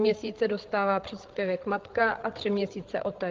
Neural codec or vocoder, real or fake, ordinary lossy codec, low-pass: codec, 16 kHz, 8 kbps, FreqCodec, larger model; fake; Opus, 16 kbps; 7.2 kHz